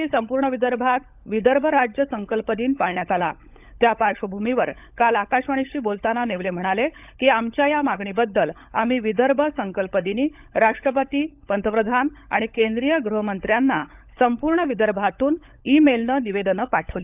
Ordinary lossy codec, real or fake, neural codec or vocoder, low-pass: none; fake; codec, 16 kHz, 16 kbps, FunCodec, trained on LibriTTS, 50 frames a second; 3.6 kHz